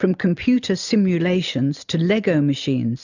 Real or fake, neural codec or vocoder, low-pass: real; none; 7.2 kHz